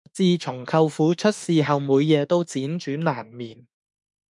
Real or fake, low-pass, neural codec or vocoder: fake; 10.8 kHz; autoencoder, 48 kHz, 32 numbers a frame, DAC-VAE, trained on Japanese speech